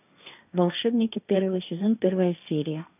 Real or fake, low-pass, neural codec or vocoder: fake; 3.6 kHz; codec, 16 kHz, 1.1 kbps, Voila-Tokenizer